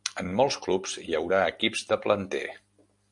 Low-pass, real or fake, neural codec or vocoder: 10.8 kHz; real; none